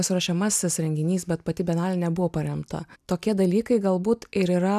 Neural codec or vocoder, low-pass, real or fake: none; 14.4 kHz; real